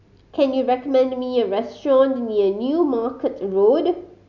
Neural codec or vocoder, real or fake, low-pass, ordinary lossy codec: none; real; 7.2 kHz; none